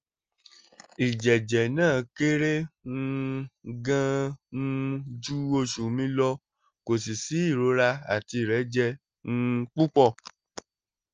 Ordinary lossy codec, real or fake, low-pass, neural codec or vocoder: Opus, 24 kbps; real; 7.2 kHz; none